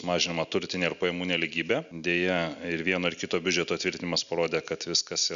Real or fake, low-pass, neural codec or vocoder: real; 7.2 kHz; none